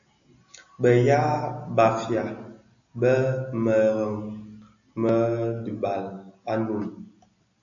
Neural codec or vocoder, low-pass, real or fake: none; 7.2 kHz; real